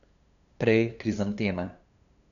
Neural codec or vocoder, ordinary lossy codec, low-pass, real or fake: codec, 16 kHz, 2 kbps, FunCodec, trained on LibriTTS, 25 frames a second; none; 7.2 kHz; fake